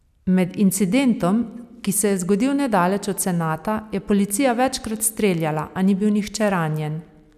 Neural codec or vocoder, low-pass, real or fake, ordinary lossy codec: none; 14.4 kHz; real; none